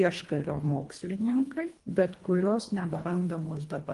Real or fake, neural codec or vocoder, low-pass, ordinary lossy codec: fake; codec, 24 kHz, 1.5 kbps, HILCodec; 10.8 kHz; Opus, 24 kbps